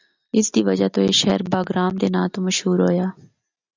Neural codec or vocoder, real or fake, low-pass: none; real; 7.2 kHz